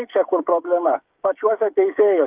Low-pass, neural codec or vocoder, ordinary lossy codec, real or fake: 3.6 kHz; codec, 16 kHz, 8 kbps, FreqCodec, smaller model; Opus, 24 kbps; fake